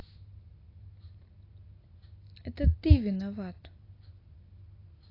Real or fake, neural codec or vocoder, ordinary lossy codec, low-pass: real; none; AAC, 24 kbps; 5.4 kHz